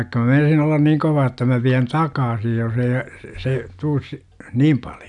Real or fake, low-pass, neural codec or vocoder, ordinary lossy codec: real; 10.8 kHz; none; none